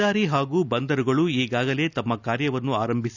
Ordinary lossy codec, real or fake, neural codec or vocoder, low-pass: none; real; none; 7.2 kHz